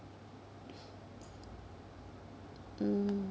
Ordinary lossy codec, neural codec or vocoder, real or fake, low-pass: none; none; real; none